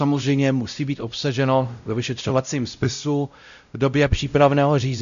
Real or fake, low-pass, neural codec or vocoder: fake; 7.2 kHz; codec, 16 kHz, 0.5 kbps, X-Codec, WavLM features, trained on Multilingual LibriSpeech